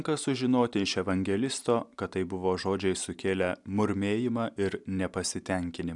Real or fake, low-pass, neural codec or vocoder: real; 10.8 kHz; none